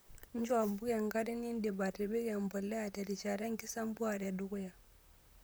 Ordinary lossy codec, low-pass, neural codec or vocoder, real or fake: none; none; vocoder, 44.1 kHz, 128 mel bands, Pupu-Vocoder; fake